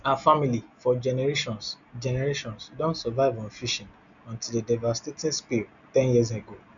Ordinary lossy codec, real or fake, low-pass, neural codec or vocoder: none; real; 7.2 kHz; none